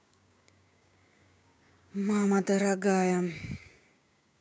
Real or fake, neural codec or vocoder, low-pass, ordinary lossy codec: fake; codec, 16 kHz, 6 kbps, DAC; none; none